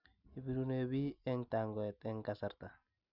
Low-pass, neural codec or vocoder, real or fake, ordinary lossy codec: 5.4 kHz; none; real; none